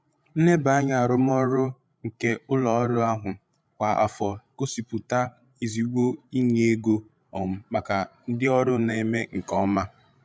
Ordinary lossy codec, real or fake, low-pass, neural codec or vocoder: none; fake; none; codec, 16 kHz, 16 kbps, FreqCodec, larger model